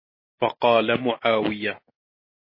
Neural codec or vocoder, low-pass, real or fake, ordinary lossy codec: none; 5.4 kHz; real; MP3, 24 kbps